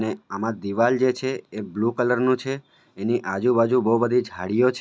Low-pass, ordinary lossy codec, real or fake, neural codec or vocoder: none; none; real; none